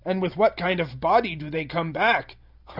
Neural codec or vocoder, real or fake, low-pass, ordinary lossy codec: none; real; 5.4 kHz; Opus, 64 kbps